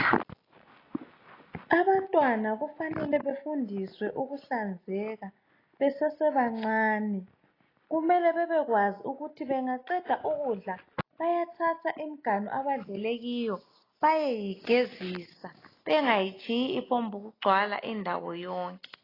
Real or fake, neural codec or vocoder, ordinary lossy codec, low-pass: real; none; AAC, 24 kbps; 5.4 kHz